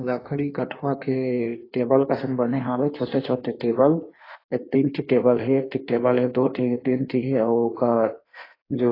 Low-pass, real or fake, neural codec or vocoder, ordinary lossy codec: 5.4 kHz; fake; codec, 16 kHz in and 24 kHz out, 1.1 kbps, FireRedTTS-2 codec; AAC, 24 kbps